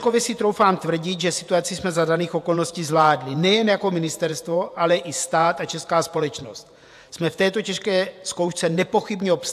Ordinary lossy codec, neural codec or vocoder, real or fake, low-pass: AAC, 96 kbps; vocoder, 48 kHz, 128 mel bands, Vocos; fake; 14.4 kHz